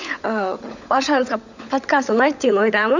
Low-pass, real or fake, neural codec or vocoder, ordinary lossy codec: 7.2 kHz; fake; codec, 16 kHz, 16 kbps, FunCodec, trained on LibriTTS, 50 frames a second; none